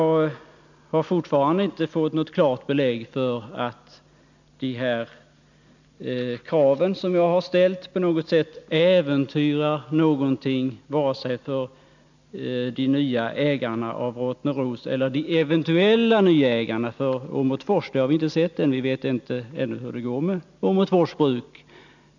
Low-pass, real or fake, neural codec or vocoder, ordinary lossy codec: 7.2 kHz; real; none; none